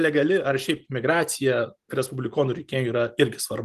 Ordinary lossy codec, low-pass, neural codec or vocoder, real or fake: Opus, 24 kbps; 14.4 kHz; none; real